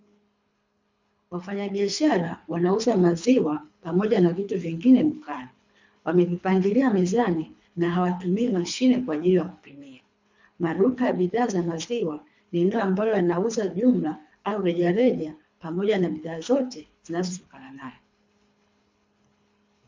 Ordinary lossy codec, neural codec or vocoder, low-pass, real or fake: MP3, 64 kbps; codec, 24 kHz, 3 kbps, HILCodec; 7.2 kHz; fake